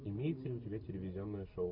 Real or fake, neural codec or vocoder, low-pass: real; none; 5.4 kHz